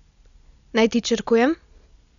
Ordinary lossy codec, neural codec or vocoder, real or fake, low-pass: none; none; real; 7.2 kHz